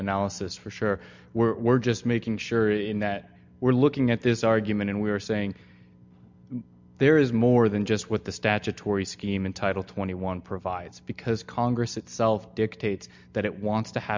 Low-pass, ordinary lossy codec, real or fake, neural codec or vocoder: 7.2 kHz; MP3, 64 kbps; real; none